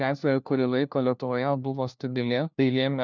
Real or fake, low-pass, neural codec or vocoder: fake; 7.2 kHz; codec, 16 kHz, 1 kbps, FunCodec, trained on LibriTTS, 50 frames a second